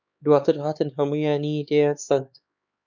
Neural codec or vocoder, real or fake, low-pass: codec, 16 kHz, 4 kbps, X-Codec, HuBERT features, trained on LibriSpeech; fake; 7.2 kHz